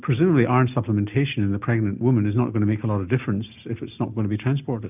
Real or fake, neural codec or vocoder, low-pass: real; none; 3.6 kHz